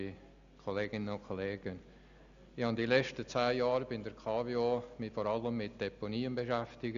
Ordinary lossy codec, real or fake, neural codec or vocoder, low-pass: MP3, 96 kbps; real; none; 7.2 kHz